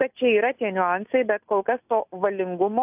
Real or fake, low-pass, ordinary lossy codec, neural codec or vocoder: real; 3.6 kHz; AAC, 32 kbps; none